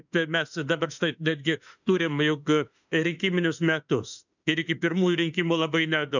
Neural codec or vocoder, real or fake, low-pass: autoencoder, 48 kHz, 32 numbers a frame, DAC-VAE, trained on Japanese speech; fake; 7.2 kHz